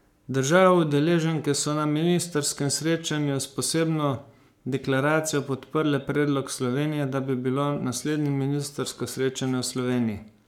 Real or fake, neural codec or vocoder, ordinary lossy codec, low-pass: fake; codec, 44.1 kHz, 7.8 kbps, Pupu-Codec; none; 19.8 kHz